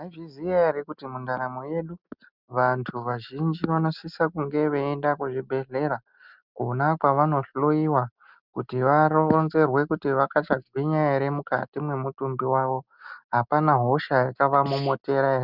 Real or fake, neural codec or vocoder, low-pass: real; none; 5.4 kHz